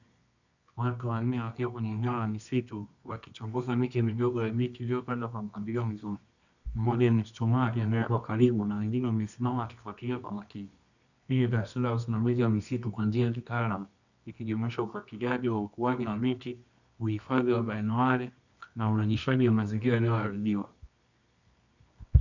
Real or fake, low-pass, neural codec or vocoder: fake; 7.2 kHz; codec, 24 kHz, 0.9 kbps, WavTokenizer, medium music audio release